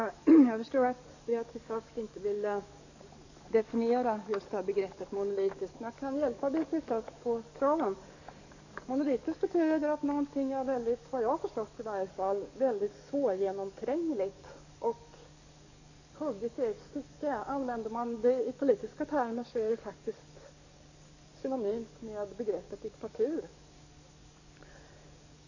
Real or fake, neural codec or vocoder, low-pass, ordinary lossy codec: fake; codec, 44.1 kHz, 7.8 kbps, DAC; 7.2 kHz; none